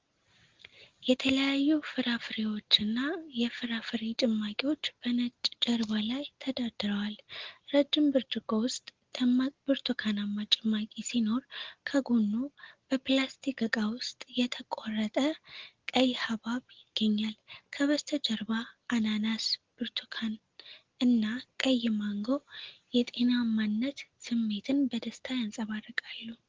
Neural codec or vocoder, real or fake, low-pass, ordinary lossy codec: none; real; 7.2 kHz; Opus, 16 kbps